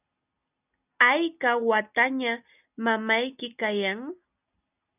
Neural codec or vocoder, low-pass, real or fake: none; 3.6 kHz; real